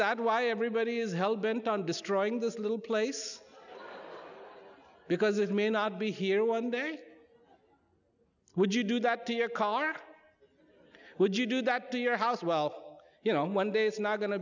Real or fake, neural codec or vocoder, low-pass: real; none; 7.2 kHz